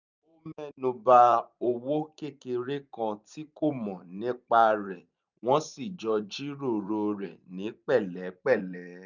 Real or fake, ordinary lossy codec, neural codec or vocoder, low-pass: fake; none; vocoder, 44.1 kHz, 128 mel bands every 512 samples, BigVGAN v2; 7.2 kHz